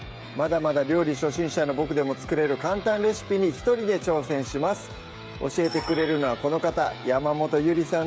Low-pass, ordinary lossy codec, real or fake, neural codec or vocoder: none; none; fake; codec, 16 kHz, 16 kbps, FreqCodec, smaller model